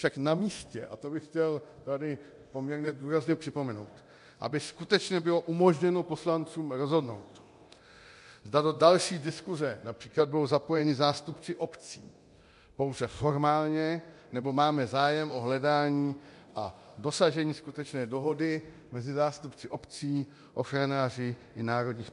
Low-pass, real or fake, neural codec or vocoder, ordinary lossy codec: 10.8 kHz; fake; codec, 24 kHz, 0.9 kbps, DualCodec; MP3, 64 kbps